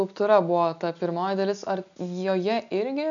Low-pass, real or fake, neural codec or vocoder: 7.2 kHz; real; none